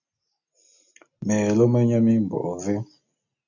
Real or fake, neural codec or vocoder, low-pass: real; none; 7.2 kHz